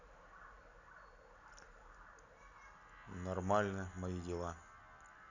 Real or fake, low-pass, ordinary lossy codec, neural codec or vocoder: real; 7.2 kHz; none; none